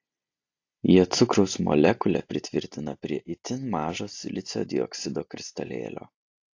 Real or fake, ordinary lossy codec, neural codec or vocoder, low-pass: real; AAC, 48 kbps; none; 7.2 kHz